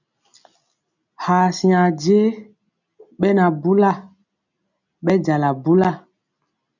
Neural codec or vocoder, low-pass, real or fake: none; 7.2 kHz; real